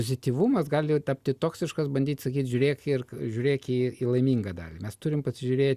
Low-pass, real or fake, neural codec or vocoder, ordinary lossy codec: 14.4 kHz; real; none; Opus, 64 kbps